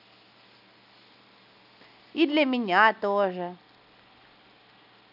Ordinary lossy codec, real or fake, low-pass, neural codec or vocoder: none; real; 5.4 kHz; none